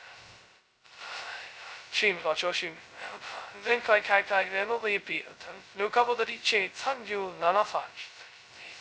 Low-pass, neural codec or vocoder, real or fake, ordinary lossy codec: none; codec, 16 kHz, 0.2 kbps, FocalCodec; fake; none